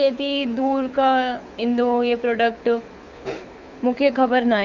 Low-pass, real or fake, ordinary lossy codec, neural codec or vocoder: 7.2 kHz; fake; none; codec, 24 kHz, 6 kbps, HILCodec